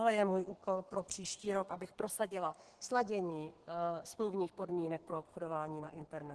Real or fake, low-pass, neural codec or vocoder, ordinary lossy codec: fake; 10.8 kHz; codec, 32 kHz, 1.9 kbps, SNAC; Opus, 16 kbps